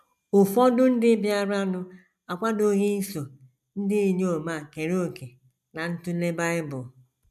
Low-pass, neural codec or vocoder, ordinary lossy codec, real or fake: 14.4 kHz; none; MP3, 96 kbps; real